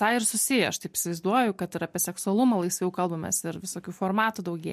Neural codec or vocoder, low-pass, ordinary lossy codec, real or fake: none; 14.4 kHz; MP3, 64 kbps; real